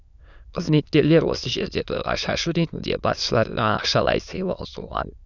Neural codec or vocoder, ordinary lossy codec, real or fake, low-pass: autoencoder, 22.05 kHz, a latent of 192 numbers a frame, VITS, trained on many speakers; none; fake; 7.2 kHz